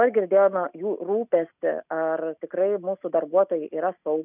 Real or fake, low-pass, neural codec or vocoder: real; 3.6 kHz; none